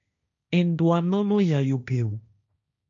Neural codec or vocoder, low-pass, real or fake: codec, 16 kHz, 1.1 kbps, Voila-Tokenizer; 7.2 kHz; fake